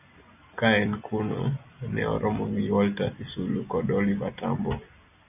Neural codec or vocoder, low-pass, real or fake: none; 3.6 kHz; real